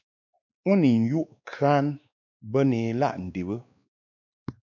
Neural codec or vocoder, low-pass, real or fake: codec, 16 kHz, 2 kbps, X-Codec, WavLM features, trained on Multilingual LibriSpeech; 7.2 kHz; fake